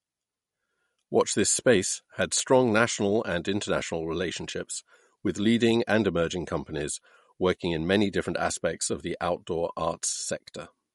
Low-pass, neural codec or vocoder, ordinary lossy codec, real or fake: 19.8 kHz; none; MP3, 48 kbps; real